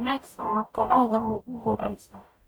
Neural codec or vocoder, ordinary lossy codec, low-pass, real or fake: codec, 44.1 kHz, 0.9 kbps, DAC; none; none; fake